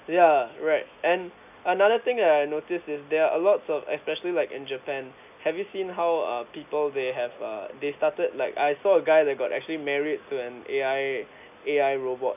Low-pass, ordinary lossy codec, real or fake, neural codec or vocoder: 3.6 kHz; none; real; none